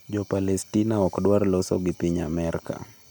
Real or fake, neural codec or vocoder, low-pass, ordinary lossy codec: real; none; none; none